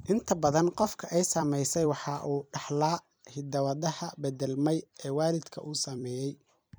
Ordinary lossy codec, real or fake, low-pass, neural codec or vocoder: none; fake; none; vocoder, 44.1 kHz, 128 mel bands every 256 samples, BigVGAN v2